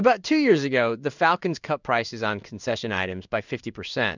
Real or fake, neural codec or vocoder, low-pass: fake; codec, 16 kHz in and 24 kHz out, 1 kbps, XY-Tokenizer; 7.2 kHz